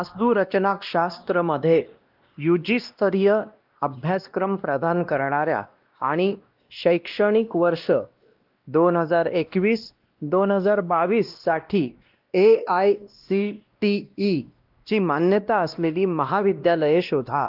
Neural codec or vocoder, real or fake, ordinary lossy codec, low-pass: codec, 16 kHz, 1 kbps, X-Codec, WavLM features, trained on Multilingual LibriSpeech; fake; Opus, 32 kbps; 5.4 kHz